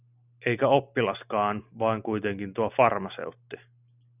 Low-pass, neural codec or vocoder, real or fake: 3.6 kHz; none; real